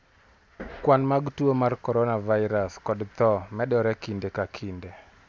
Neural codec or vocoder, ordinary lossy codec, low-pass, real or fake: none; none; none; real